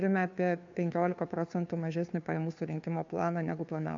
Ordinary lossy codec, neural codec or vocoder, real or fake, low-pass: MP3, 48 kbps; codec, 16 kHz, 2 kbps, FunCodec, trained on Chinese and English, 25 frames a second; fake; 7.2 kHz